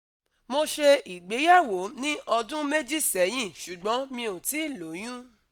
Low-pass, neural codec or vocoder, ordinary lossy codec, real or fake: none; none; none; real